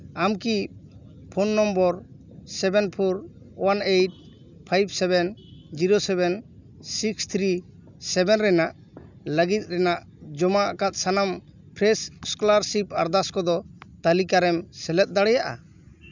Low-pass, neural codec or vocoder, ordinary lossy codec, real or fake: 7.2 kHz; none; none; real